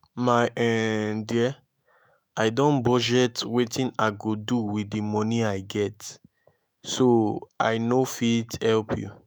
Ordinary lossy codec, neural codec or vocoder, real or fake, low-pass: none; autoencoder, 48 kHz, 128 numbers a frame, DAC-VAE, trained on Japanese speech; fake; none